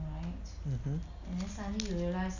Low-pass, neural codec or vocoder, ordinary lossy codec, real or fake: 7.2 kHz; none; none; real